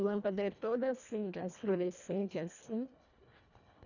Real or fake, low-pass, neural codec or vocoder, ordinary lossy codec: fake; 7.2 kHz; codec, 24 kHz, 1.5 kbps, HILCodec; none